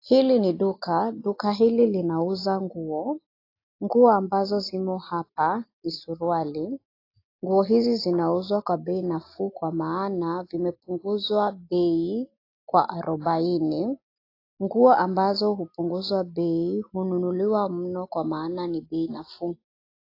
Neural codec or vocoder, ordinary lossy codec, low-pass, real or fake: none; AAC, 32 kbps; 5.4 kHz; real